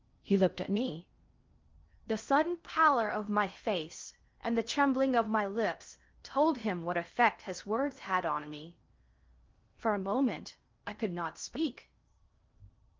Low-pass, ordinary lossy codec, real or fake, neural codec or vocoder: 7.2 kHz; Opus, 32 kbps; fake; codec, 16 kHz in and 24 kHz out, 0.6 kbps, FocalCodec, streaming, 2048 codes